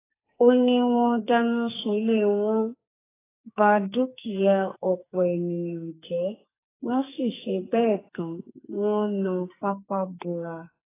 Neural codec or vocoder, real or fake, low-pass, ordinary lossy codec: codec, 44.1 kHz, 2.6 kbps, SNAC; fake; 3.6 kHz; AAC, 16 kbps